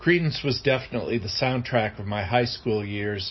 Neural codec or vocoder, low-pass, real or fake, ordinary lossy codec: none; 7.2 kHz; real; MP3, 24 kbps